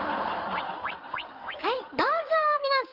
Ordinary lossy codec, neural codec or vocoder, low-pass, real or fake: Opus, 24 kbps; codec, 16 kHz in and 24 kHz out, 2.2 kbps, FireRedTTS-2 codec; 5.4 kHz; fake